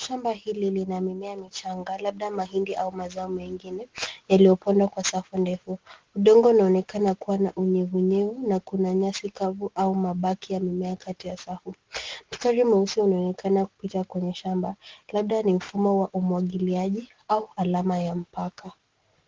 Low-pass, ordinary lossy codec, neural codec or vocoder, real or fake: 7.2 kHz; Opus, 16 kbps; none; real